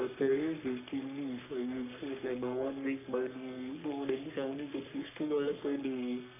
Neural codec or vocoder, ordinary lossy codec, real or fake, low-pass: codec, 44.1 kHz, 3.4 kbps, Pupu-Codec; none; fake; 3.6 kHz